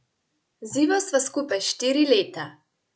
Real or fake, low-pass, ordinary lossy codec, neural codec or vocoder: real; none; none; none